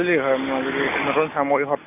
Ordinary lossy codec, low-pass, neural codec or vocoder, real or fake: none; 3.6 kHz; none; real